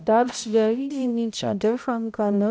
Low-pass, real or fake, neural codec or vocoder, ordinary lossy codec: none; fake; codec, 16 kHz, 0.5 kbps, X-Codec, HuBERT features, trained on balanced general audio; none